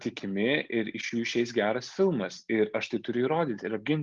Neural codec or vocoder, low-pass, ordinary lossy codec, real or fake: none; 7.2 kHz; Opus, 32 kbps; real